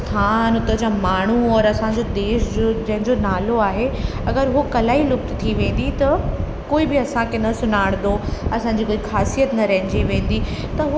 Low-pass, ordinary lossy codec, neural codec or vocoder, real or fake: none; none; none; real